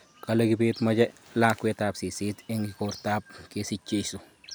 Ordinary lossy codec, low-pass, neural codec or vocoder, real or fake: none; none; none; real